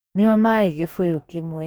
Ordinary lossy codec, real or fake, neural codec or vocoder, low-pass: none; fake; codec, 44.1 kHz, 2.6 kbps, DAC; none